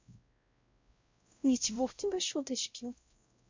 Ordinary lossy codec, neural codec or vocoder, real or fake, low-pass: MP3, 64 kbps; codec, 16 kHz, 0.5 kbps, X-Codec, WavLM features, trained on Multilingual LibriSpeech; fake; 7.2 kHz